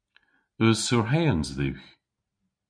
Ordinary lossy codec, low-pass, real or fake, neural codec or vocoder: MP3, 64 kbps; 9.9 kHz; real; none